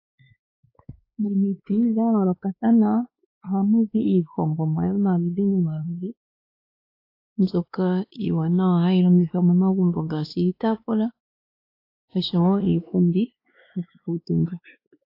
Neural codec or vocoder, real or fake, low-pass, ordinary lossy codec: codec, 16 kHz, 2 kbps, X-Codec, WavLM features, trained on Multilingual LibriSpeech; fake; 5.4 kHz; AAC, 32 kbps